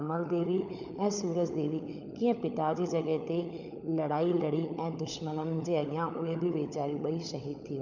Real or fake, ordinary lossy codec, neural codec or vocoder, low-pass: fake; none; codec, 16 kHz, 16 kbps, FunCodec, trained on LibriTTS, 50 frames a second; 7.2 kHz